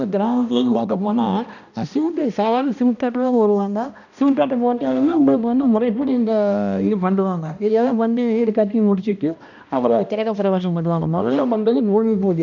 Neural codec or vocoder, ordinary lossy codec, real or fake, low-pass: codec, 16 kHz, 1 kbps, X-Codec, HuBERT features, trained on balanced general audio; none; fake; 7.2 kHz